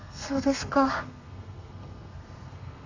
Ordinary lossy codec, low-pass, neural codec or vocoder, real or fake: AAC, 48 kbps; 7.2 kHz; codec, 32 kHz, 1.9 kbps, SNAC; fake